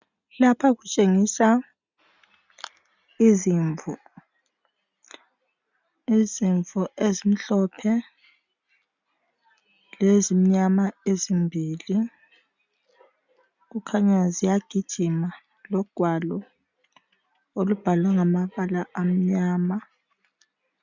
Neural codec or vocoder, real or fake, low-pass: none; real; 7.2 kHz